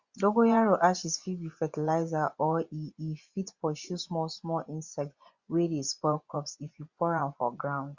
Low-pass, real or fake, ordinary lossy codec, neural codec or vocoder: 7.2 kHz; fake; Opus, 64 kbps; vocoder, 24 kHz, 100 mel bands, Vocos